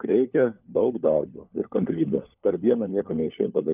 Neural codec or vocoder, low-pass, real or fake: codec, 16 kHz, 4 kbps, FunCodec, trained on LibriTTS, 50 frames a second; 3.6 kHz; fake